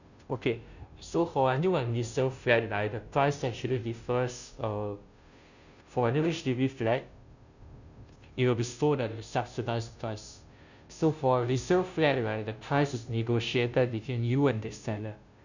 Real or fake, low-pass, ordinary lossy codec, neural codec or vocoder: fake; 7.2 kHz; none; codec, 16 kHz, 0.5 kbps, FunCodec, trained on Chinese and English, 25 frames a second